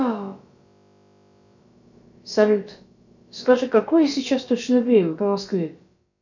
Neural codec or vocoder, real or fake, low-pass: codec, 16 kHz, about 1 kbps, DyCAST, with the encoder's durations; fake; 7.2 kHz